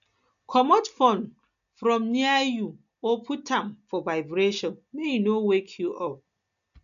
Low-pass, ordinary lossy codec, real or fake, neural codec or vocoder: 7.2 kHz; none; real; none